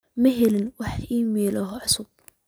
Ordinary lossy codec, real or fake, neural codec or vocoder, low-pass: none; real; none; none